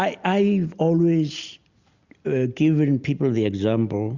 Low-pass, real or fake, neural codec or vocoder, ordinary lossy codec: 7.2 kHz; real; none; Opus, 64 kbps